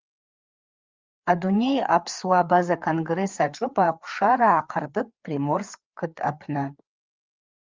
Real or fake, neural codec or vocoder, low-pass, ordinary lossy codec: fake; codec, 16 kHz, 4 kbps, FreqCodec, larger model; 7.2 kHz; Opus, 32 kbps